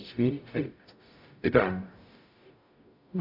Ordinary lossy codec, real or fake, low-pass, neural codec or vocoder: AAC, 48 kbps; fake; 5.4 kHz; codec, 44.1 kHz, 0.9 kbps, DAC